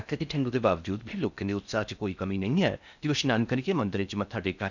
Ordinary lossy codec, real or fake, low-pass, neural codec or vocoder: none; fake; 7.2 kHz; codec, 16 kHz in and 24 kHz out, 0.6 kbps, FocalCodec, streaming, 4096 codes